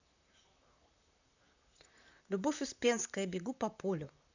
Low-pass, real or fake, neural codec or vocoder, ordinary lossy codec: 7.2 kHz; fake; vocoder, 44.1 kHz, 128 mel bands, Pupu-Vocoder; none